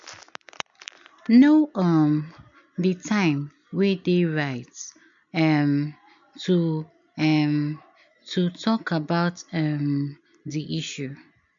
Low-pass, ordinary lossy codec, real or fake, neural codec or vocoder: 7.2 kHz; MP3, 64 kbps; real; none